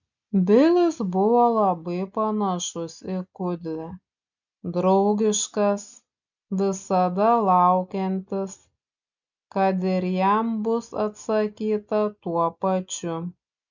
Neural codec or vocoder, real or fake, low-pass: none; real; 7.2 kHz